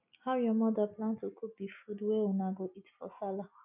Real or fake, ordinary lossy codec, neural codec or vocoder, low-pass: real; none; none; 3.6 kHz